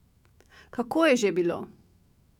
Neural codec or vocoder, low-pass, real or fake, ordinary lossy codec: autoencoder, 48 kHz, 128 numbers a frame, DAC-VAE, trained on Japanese speech; 19.8 kHz; fake; none